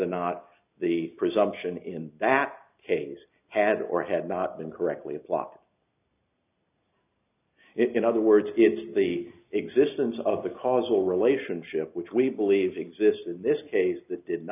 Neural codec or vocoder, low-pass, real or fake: none; 3.6 kHz; real